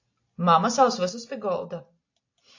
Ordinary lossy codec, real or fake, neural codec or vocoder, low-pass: AAC, 48 kbps; real; none; 7.2 kHz